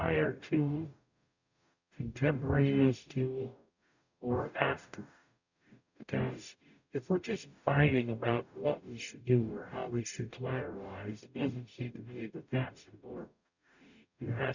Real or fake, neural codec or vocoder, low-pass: fake; codec, 44.1 kHz, 0.9 kbps, DAC; 7.2 kHz